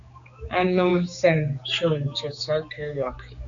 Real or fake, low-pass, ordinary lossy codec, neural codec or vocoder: fake; 7.2 kHz; AAC, 64 kbps; codec, 16 kHz, 4 kbps, X-Codec, HuBERT features, trained on general audio